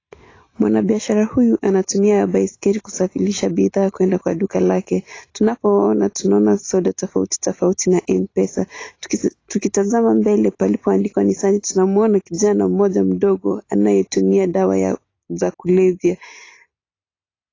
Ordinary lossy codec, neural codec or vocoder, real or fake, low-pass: AAC, 32 kbps; none; real; 7.2 kHz